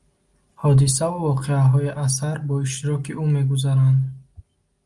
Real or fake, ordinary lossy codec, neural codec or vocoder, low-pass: real; Opus, 32 kbps; none; 10.8 kHz